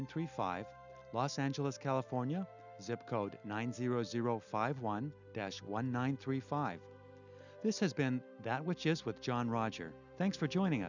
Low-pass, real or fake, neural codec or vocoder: 7.2 kHz; real; none